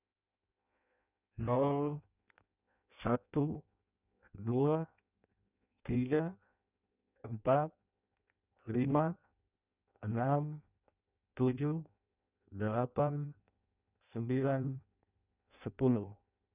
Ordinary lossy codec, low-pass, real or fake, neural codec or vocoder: none; 3.6 kHz; fake; codec, 16 kHz in and 24 kHz out, 0.6 kbps, FireRedTTS-2 codec